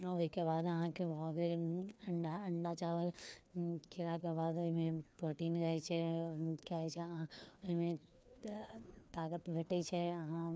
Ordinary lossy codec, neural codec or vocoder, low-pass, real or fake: none; codec, 16 kHz, 2 kbps, FreqCodec, larger model; none; fake